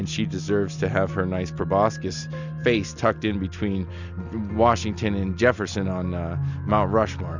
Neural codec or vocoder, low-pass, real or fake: none; 7.2 kHz; real